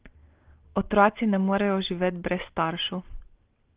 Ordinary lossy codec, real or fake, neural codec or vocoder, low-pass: Opus, 16 kbps; real; none; 3.6 kHz